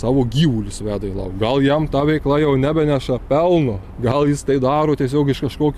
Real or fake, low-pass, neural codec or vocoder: real; 14.4 kHz; none